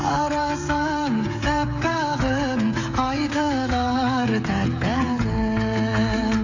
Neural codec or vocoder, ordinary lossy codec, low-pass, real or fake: codec, 16 kHz, 16 kbps, FreqCodec, smaller model; AAC, 48 kbps; 7.2 kHz; fake